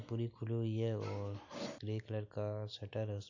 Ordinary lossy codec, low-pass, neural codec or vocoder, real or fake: AAC, 48 kbps; 7.2 kHz; none; real